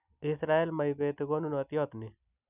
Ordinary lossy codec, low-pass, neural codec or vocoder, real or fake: none; 3.6 kHz; none; real